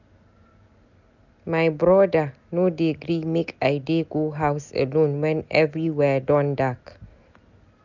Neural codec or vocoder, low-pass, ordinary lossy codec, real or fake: none; 7.2 kHz; none; real